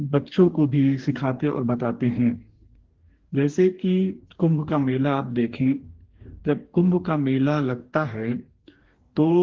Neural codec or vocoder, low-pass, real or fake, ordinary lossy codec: codec, 44.1 kHz, 2.6 kbps, DAC; 7.2 kHz; fake; Opus, 16 kbps